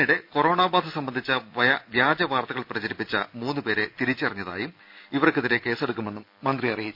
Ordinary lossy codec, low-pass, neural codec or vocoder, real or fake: none; 5.4 kHz; none; real